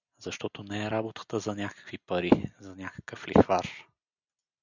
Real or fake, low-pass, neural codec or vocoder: real; 7.2 kHz; none